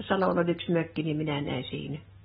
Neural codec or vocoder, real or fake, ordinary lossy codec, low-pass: none; real; AAC, 16 kbps; 7.2 kHz